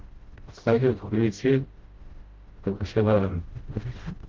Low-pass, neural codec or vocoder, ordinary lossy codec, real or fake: 7.2 kHz; codec, 16 kHz, 0.5 kbps, FreqCodec, smaller model; Opus, 16 kbps; fake